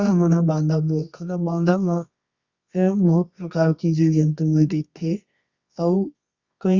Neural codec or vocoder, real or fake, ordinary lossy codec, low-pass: codec, 24 kHz, 0.9 kbps, WavTokenizer, medium music audio release; fake; Opus, 64 kbps; 7.2 kHz